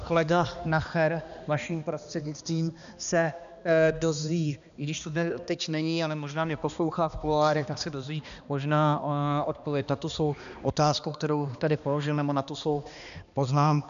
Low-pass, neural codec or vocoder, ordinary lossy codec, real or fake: 7.2 kHz; codec, 16 kHz, 2 kbps, X-Codec, HuBERT features, trained on balanced general audio; MP3, 96 kbps; fake